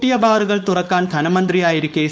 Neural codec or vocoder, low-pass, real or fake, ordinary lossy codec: codec, 16 kHz, 4.8 kbps, FACodec; none; fake; none